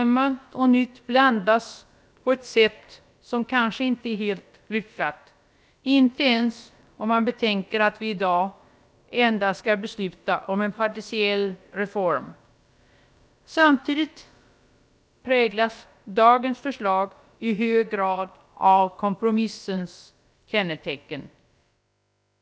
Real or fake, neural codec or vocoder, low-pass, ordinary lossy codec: fake; codec, 16 kHz, about 1 kbps, DyCAST, with the encoder's durations; none; none